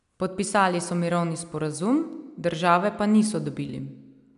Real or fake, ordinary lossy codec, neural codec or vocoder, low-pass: real; none; none; 10.8 kHz